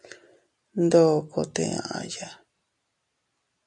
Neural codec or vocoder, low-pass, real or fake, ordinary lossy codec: none; 10.8 kHz; real; MP3, 64 kbps